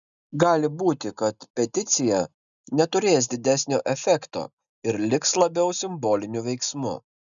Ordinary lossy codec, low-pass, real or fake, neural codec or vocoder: MP3, 96 kbps; 7.2 kHz; real; none